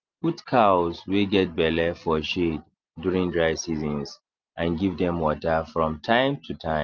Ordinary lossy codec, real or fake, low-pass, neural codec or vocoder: Opus, 32 kbps; real; 7.2 kHz; none